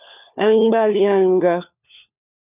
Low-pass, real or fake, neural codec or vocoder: 3.6 kHz; fake; codec, 16 kHz, 4 kbps, FunCodec, trained on LibriTTS, 50 frames a second